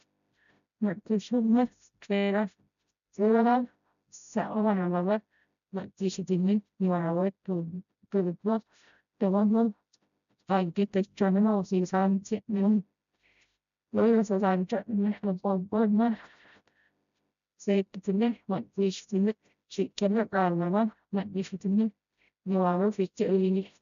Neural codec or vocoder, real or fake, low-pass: codec, 16 kHz, 0.5 kbps, FreqCodec, smaller model; fake; 7.2 kHz